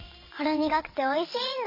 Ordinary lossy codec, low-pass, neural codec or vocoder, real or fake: MP3, 24 kbps; 5.4 kHz; vocoder, 22.05 kHz, 80 mel bands, WaveNeXt; fake